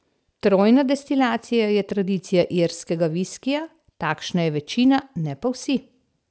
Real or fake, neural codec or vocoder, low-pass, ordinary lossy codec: real; none; none; none